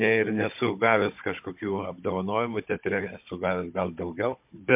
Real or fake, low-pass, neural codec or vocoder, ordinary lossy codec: fake; 3.6 kHz; codec, 16 kHz, 16 kbps, FunCodec, trained on Chinese and English, 50 frames a second; MP3, 32 kbps